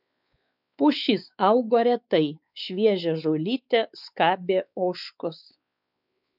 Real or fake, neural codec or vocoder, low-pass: fake; codec, 16 kHz, 4 kbps, X-Codec, WavLM features, trained on Multilingual LibriSpeech; 5.4 kHz